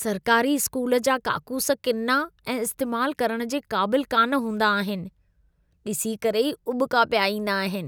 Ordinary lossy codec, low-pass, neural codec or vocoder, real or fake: none; none; none; real